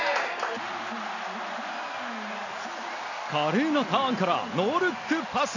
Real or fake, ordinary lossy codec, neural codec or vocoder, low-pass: real; none; none; 7.2 kHz